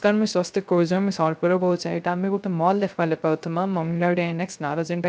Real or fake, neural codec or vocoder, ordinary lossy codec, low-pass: fake; codec, 16 kHz, 0.3 kbps, FocalCodec; none; none